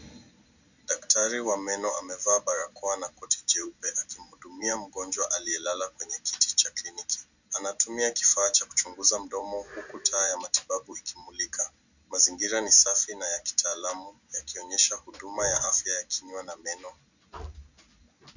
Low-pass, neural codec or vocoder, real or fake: 7.2 kHz; none; real